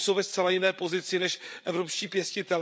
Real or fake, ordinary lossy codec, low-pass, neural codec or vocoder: fake; none; none; codec, 16 kHz, 8 kbps, FreqCodec, larger model